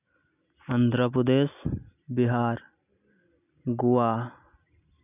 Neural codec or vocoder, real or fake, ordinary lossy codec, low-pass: none; real; none; 3.6 kHz